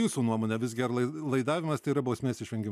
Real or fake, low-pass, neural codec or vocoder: real; 14.4 kHz; none